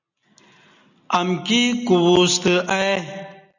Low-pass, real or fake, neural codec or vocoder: 7.2 kHz; real; none